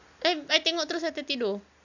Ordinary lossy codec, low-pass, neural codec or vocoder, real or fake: none; 7.2 kHz; none; real